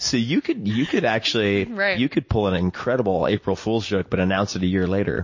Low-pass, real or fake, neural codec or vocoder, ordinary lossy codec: 7.2 kHz; real; none; MP3, 32 kbps